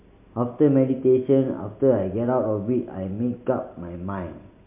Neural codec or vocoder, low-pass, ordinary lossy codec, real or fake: none; 3.6 kHz; MP3, 32 kbps; real